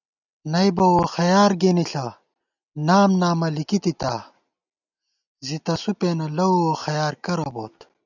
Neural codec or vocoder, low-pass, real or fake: none; 7.2 kHz; real